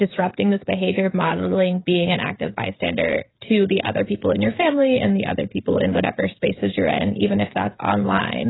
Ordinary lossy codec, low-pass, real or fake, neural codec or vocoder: AAC, 16 kbps; 7.2 kHz; fake; codec, 16 kHz, 16 kbps, FreqCodec, smaller model